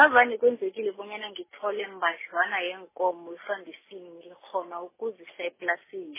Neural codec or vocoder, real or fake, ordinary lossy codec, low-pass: none; real; MP3, 16 kbps; 3.6 kHz